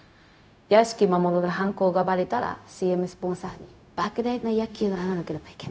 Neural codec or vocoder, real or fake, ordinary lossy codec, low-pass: codec, 16 kHz, 0.4 kbps, LongCat-Audio-Codec; fake; none; none